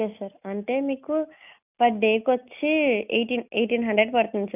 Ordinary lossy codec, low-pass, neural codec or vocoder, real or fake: none; 3.6 kHz; none; real